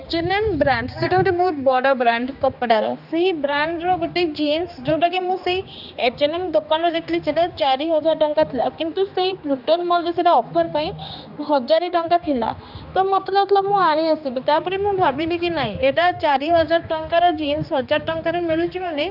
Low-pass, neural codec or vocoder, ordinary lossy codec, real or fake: 5.4 kHz; codec, 16 kHz, 2 kbps, X-Codec, HuBERT features, trained on general audio; none; fake